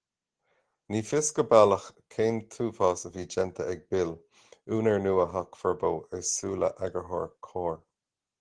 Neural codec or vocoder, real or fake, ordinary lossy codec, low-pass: none; real; Opus, 16 kbps; 9.9 kHz